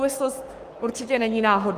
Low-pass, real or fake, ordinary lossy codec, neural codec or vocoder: 14.4 kHz; fake; Opus, 32 kbps; codec, 44.1 kHz, 7.8 kbps, Pupu-Codec